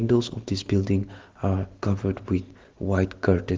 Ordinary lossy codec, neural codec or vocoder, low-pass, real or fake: Opus, 16 kbps; none; 7.2 kHz; real